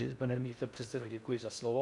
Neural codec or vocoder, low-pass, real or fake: codec, 16 kHz in and 24 kHz out, 0.6 kbps, FocalCodec, streaming, 4096 codes; 10.8 kHz; fake